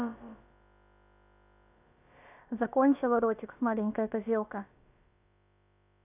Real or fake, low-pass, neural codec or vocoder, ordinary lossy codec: fake; 3.6 kHz; codec, 16 kHz, about 1 kbps, DyCAST, with the encoder's durations; none